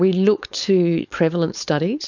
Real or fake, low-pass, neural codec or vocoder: fake; 7.2 kHz; codec, 16 kHz, 4.8 kbps, FACodec